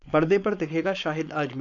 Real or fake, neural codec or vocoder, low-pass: fake; codec, 16 kHz, 4.8 kbps, FACodec; 7.2 kHz